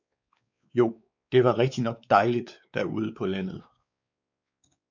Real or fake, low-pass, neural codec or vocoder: fake; 7.2 kHz; codec, 16 kHz, 4 kbps, X-Codec, WavLM features, trained on Multilingual LibriSpeech